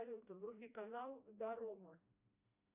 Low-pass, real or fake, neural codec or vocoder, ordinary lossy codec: 3.6 kHz; fake; codec, 16 kHz, 1 kbps, FreqCodec, smaller model; AAC, 32 kbps